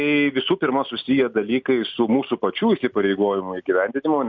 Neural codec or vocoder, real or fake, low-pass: none; real; 7.2 kHz